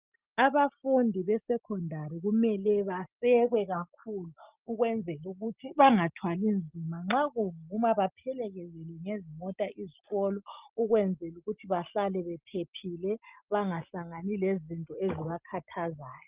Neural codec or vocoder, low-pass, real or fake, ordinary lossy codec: none; 3.6 kHz; real; Opus, 24 kbps